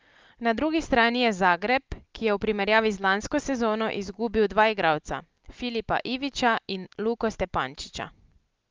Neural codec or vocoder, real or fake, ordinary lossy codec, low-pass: none; real; Opus, 32 kbps; 7.2 kHz